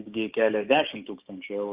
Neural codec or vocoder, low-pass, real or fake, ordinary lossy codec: codec, 16 kHz, 6 kbps, DAC; 3.6 kHz; fake; Opus, 24 kbps